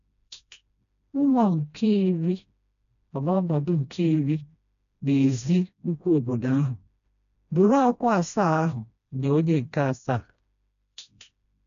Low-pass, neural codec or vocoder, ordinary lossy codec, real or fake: 7.2 kHz; codec, 16 kHz, 1 kbps, FreqCodec, smaller model; none; fake